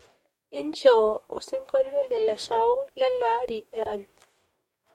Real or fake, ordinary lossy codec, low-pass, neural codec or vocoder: fake; MP3, 64 kbps; 19.8 kHz; codec, 44.1 kHz, 2.6 kbps, DAC